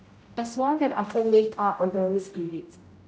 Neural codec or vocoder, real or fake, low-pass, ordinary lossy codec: codec, 16 kHz, 0.5 kbps, X-Codec, HuBERT features, trained on general audio; fake; none; none